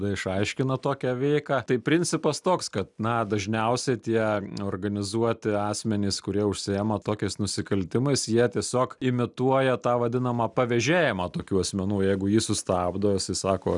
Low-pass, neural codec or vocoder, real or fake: 10.8 kHz; none; real